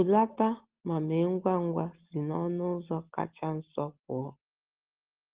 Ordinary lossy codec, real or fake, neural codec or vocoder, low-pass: Opus, 16 kbps; real; none; 3.6 kHz